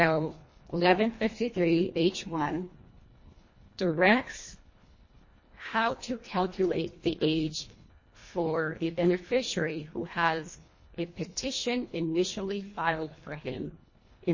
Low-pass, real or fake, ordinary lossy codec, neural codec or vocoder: 7.2 kHz; fake; MP3, 32 kbps; codec, 24 kHz, 1.5 kbps, HILCodec